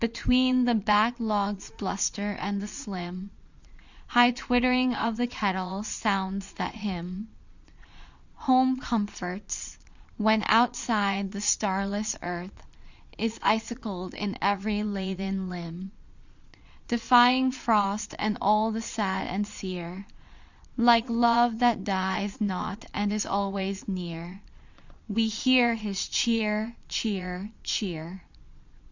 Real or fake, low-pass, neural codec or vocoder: fake; 7.2 kHz; vocoder, 22.05 kHz, 80 mel bands, Vocos